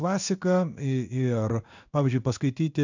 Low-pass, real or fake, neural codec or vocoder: 7.2 kHz; fake; codec, 16 kHz in and 24 kHz out, 1 kbps, XY-Tokenizer